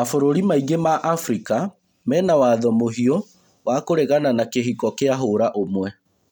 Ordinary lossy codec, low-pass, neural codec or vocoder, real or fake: none; 19.8 kHz; none; real